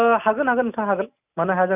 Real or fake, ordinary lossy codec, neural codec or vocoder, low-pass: real; none; none; 3.6 kHz